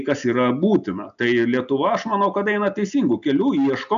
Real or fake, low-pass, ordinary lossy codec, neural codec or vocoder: real; 7.2 kHz; AAC, 96 kbps; none